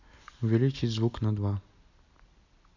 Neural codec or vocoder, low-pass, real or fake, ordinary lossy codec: none; 7.2 kHz; real; none